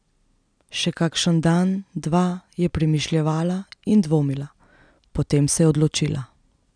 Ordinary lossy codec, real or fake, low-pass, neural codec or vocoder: none; real; 9.9 kHz; none